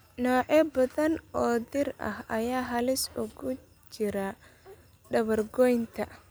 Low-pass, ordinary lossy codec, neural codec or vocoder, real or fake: none; none; none; real